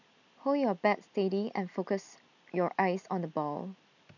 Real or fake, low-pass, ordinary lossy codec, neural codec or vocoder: real; 7.2 kHz; none; none